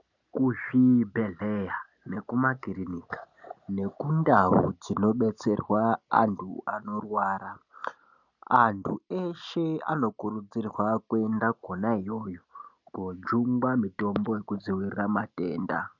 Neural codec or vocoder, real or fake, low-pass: none; real; 7.2 kHz